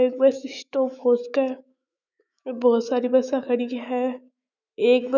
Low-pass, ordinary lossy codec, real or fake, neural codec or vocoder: 7.2 kHz; none; fake; autoencoder, 48 kHz, 128 numbers a frame, DAC-VAE, trained on Japanese speech